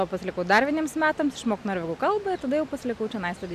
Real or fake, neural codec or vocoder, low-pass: real; none; 14.4 kHz